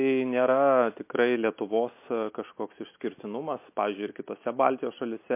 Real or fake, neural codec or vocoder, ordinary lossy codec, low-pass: real; none; MP3, 24 kbps; 3.6 kHz